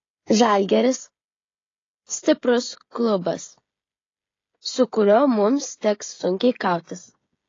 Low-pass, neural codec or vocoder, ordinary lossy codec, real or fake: 7.2 kHz; codec, 16 kHz, 16 kbps, FreqCodec, smaller model; AAC, 32 kbps; fake